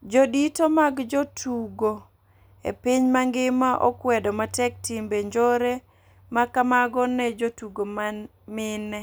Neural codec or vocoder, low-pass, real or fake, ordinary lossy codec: none; none; real; none